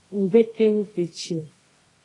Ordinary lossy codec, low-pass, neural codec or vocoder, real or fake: AAC, 32 kbps; 10.8 kHz; codec, 24 kHz, 0.9 kbps, WavTokenizer, medium music audio release; fake